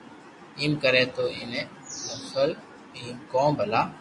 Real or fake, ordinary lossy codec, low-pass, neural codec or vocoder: real; MP3, 48 kbps; 10.8 kHz; none